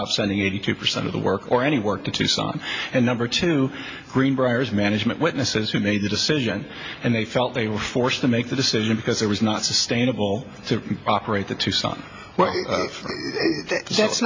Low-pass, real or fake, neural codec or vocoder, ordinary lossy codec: 7.2 kHz; real; none; MP3, 48 kbps